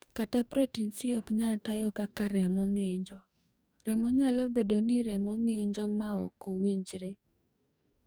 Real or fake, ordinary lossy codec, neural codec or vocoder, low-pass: fake; none; codec, 44.1 kHz, 2.6 kbps, DAC; none